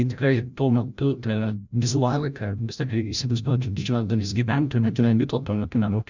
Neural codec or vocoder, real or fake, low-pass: codec, 16 kHz, 0.5 kbps, FreqCodec, larger model; fake; 7.2 kHz